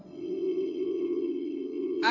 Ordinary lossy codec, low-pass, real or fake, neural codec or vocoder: none; 7.2 kHz; fake; vocoder, 22.05 kHz, 80 mel bands, WaveNeXt